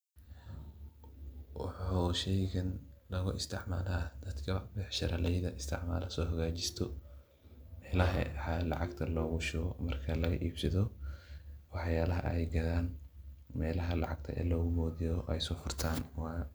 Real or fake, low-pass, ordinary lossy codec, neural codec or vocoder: real; none; none; none